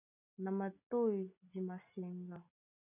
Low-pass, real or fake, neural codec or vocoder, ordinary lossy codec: 3.6 kHz; real; none; MP3, 32 kbps